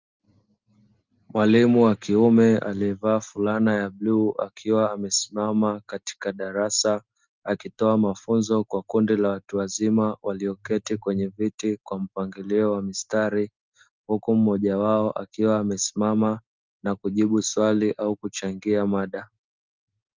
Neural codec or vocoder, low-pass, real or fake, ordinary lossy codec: none; 7.2 kHz; real; Opus, 24 kbps